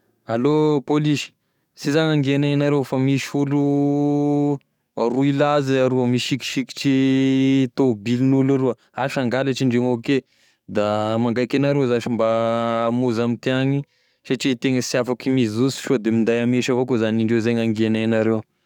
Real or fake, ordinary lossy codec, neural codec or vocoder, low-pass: fake; none; codec, 44.1 kHz, 7.8 kbps, DAC; 19.8 kHz